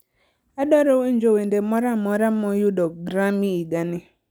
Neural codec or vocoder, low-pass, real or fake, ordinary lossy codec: none; none; real; none